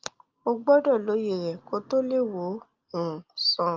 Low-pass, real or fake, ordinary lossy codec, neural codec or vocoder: 7.2 kHz; real; Opus, 32 kbps; none